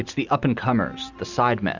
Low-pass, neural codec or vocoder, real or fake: 7.2 kHz; none; real